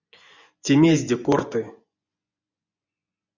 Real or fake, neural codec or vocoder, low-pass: fake; vocoder, 24 kHz, 100 mel bands, Vocos; 7.2 kHz